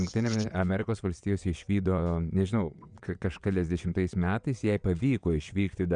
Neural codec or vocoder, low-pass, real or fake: vocoder, 22.05 kHz, 80 mel bands, WaveNeXt; 9.9 kHz; fake